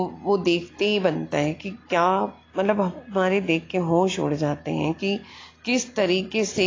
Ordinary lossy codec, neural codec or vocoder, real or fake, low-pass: AAC, 32 kbps; none; real; 7.2 kHz